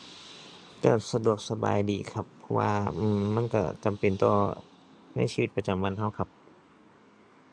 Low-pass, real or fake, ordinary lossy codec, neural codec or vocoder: 9.9 kHz; fake; none; codec, 44.1 kHz, 7.8 kbps, DAC